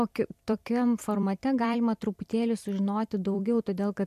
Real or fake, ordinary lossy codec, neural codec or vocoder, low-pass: fake; MP3, 64 kbps; vocoder, 44.1 kHz, 128 mel bands every 256 samples, BigVGAN v2; 14.4 kHz